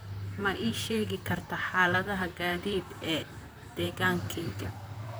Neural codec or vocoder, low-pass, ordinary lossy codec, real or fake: vocoder, 44.1 kHz, 128 mel bands, Pupu-Vocoder; none; none; fake